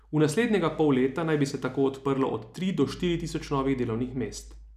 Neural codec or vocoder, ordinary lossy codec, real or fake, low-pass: none; none; real; 14.4 kHz